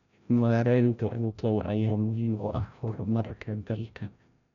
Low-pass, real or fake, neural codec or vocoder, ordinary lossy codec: 7.2 kHz; fake; codec, 16 kHz, 0.5 kbps, FreqCodec, larger model; none